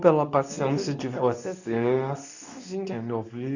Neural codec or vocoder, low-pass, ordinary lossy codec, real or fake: codec, 24 kHz, 0.9 kbps, WavTokenizer, medium speech release version 2; 7.2 kHz; none; fake